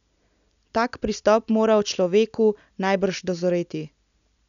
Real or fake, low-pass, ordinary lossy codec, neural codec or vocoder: real; 7.2 kHz; none; none